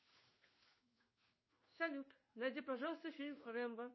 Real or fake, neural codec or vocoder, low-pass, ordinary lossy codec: fake; codec, 16 kHz in and 24 kHz out, 1 kbps, XY-Tokenizer; 7.2 kHz; MP3, 24 kbps